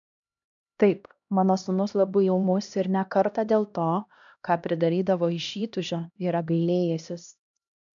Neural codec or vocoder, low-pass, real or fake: codec, 16 kHz, 1 kbps, X-Codec, HuBERT features, trained on LibriSpeech; 7.2 kHz; fake